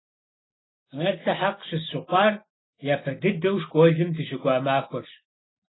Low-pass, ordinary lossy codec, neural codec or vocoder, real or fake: 7.2 kHz; AAC, 16 kbps; none; real